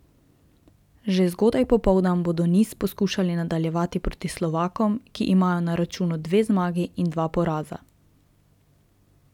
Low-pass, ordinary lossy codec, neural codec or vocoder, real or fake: 19.8 kHz; none; none; real